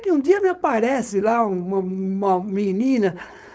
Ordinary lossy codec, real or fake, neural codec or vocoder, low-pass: none; fake; codec, 16 kHz, 4.8 kbps, FACodec; none